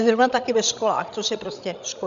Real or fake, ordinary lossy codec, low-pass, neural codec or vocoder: fake; Opus, 64 kbps; 7.2 kHz; codec, 16 kHz, 16 kbps, FreqCodec, larger model